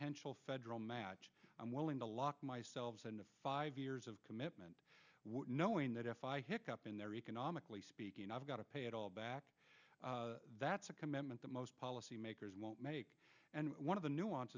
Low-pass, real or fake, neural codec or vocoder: 7.2 kHz; real; none